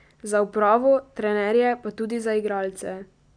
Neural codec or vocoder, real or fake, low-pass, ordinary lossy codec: none; real; 9.9 kHz; none